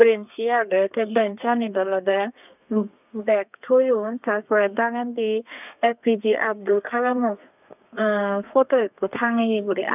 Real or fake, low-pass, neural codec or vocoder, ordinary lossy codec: fake; 3.6 kHz; codec, 32 kHz, 1.9 kbps, SNAC; none